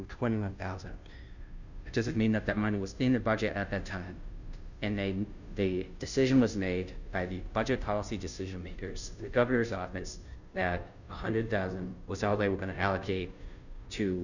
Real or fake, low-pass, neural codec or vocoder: fake; 7.2 kHz; codec, 16 kHz, 0.5 kbps, FunCodec, trained on Chinese and English, 25 frames a second